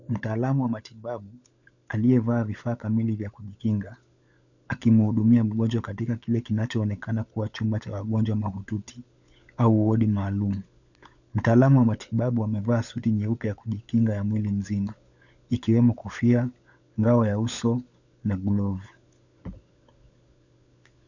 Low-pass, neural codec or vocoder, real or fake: 7.2 kHz; codec, 16 kHz, 16 kbps, FunCodec, trained on LibriTTS, 50 frames a second; fake